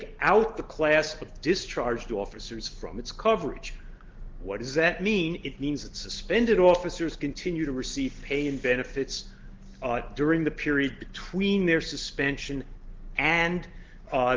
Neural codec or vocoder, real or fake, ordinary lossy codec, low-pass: none; real; Opus, 16 kbps; 7.2 kHz